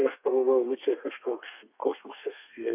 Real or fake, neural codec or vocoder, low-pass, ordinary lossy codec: fake; codec, 32 kHz, 1.9 kbps, SNAC; 3.6 kHz; AAC, 32 kbps